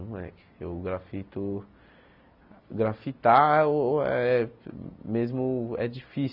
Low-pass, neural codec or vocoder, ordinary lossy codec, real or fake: 5.4 kHz; none; none; real